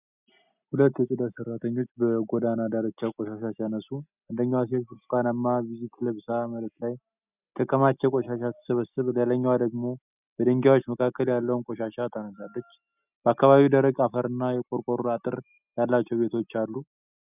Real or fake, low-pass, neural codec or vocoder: real; 3.6 kHz; none